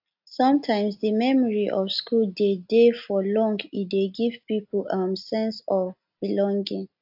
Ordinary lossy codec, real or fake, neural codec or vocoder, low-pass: AAC, 48 kbps; real; none; 5.4 kHz